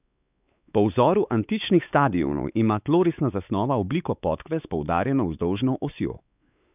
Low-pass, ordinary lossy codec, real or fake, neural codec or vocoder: 3.6 kHz; none; fake; codec, 16 kHz, 4 kbps, X-Codec, WavLM features, trained on Multilingual LibriSpeech